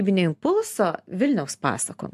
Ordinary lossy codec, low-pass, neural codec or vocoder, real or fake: AAC, 96 kbps; 14.4 kHz; none; real